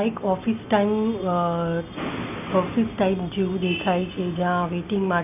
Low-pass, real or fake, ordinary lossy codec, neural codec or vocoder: 3.6 kHz; real; none; none